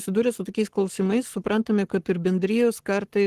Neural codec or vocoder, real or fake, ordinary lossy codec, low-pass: codec, 44.1 kHz, 7.8 kbps, Pupu-Codec; fake; Opus, 16 kbps; 14.4 kHz